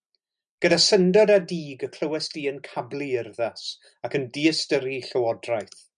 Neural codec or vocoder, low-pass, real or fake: none; 9.9 kHz; real